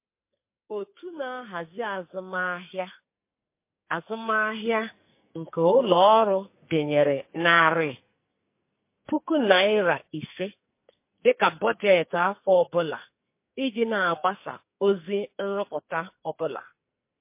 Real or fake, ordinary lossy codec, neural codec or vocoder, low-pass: fake; MP3, 24 kbps; codec, 44.1 kHz, 2.6 kbps, SNAC; 3.6 kHz